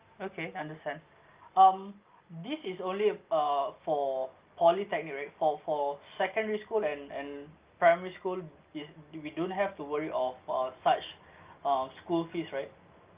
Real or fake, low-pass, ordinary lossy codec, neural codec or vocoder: real; 3.6 kHz; Opus, 32 kbps; none